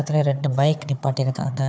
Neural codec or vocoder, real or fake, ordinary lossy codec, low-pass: codec, 16 kHz, 4 kbps, FunCodec, trained on Chinese and English, 50 frames a second; fake; none; none